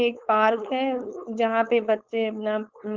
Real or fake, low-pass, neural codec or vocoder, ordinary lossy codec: fake; 7.2 kHz; codec, 16 kHz, 4.8 kbps, FACodec; Opus, 32 kbps